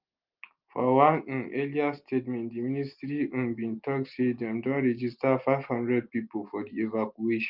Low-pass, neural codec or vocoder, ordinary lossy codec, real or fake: 5.4 kHz; none; Opus, 24 kbps; real